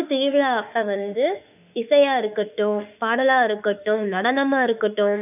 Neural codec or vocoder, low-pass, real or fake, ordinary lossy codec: autoencoder, 48 kHz, 32 numbers a frame, DAC-VAE, trained on Japanese speech; 3.6 kHz; fake; none